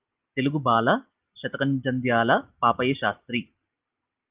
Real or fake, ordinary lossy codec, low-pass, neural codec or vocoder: real; Opus, 32 kbps; 3.6 kHz; none